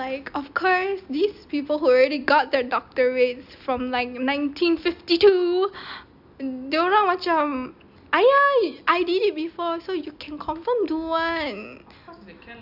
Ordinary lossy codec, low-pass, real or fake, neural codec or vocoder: none; 5.4 kHz; real; none